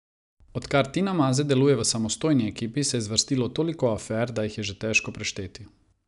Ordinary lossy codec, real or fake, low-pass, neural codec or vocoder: none; real; 10.8 kHz; none